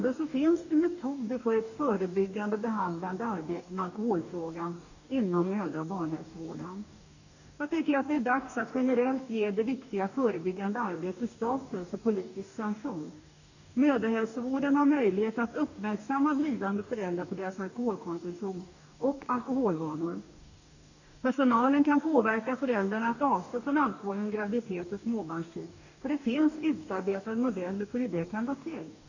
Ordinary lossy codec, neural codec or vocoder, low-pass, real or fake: none; codec, 44.1 kHz, 2.6 kbps, DAC; 7.2 kHz; fake